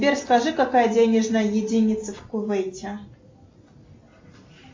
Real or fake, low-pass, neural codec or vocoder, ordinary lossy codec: real; 7.2 kHz; none; MP3, 48 kbps